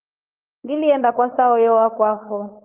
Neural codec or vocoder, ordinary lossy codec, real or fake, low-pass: codec, 16 kHz, 4.8 kbps, FACodec; Opus, 32 kbps; fake; 3.6 kHz